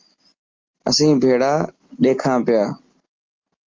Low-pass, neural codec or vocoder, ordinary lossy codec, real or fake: 7.2 kHz; none; Opus, 24 kbps; real